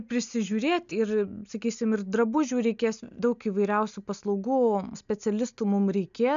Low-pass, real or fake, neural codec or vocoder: 7.2 kHz; real; none